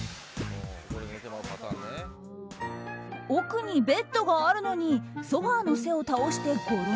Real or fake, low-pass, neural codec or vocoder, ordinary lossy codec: real; none; none; none